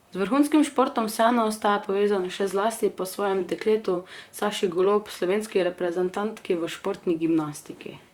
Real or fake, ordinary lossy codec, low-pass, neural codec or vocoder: fake; Opus, 64 kbps; 19.8 kHz; vocoder, 44.1 kHz, 128 mel bands, Pupu-Vocoder